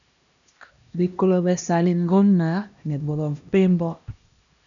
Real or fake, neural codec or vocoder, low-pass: fake; codec, 16 kHz, 1 kbps, X-Codec, HuBERT features, trained on LibriSpeech; 7.2 kHz